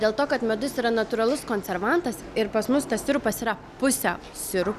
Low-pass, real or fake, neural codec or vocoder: 14.4 kHz; real; none